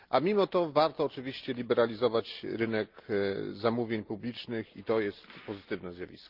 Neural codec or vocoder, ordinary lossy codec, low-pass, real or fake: none; Opus, 32 kbps; 5.4 kHz; real